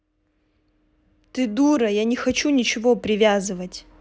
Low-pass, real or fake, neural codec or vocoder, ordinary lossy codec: none; real; none; none